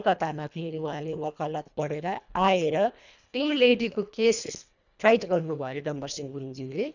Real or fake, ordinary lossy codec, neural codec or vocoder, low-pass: fake; none; codec, 24 kHz, 1.5 kbps, HILCodec; 7.2 kHz